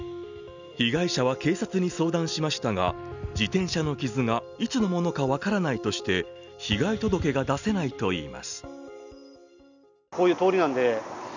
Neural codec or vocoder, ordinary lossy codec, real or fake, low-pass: none; none; real; 7.2 kHz